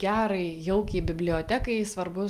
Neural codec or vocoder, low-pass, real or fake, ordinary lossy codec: none; 14.4 kHz; real; Opus, 32 kbps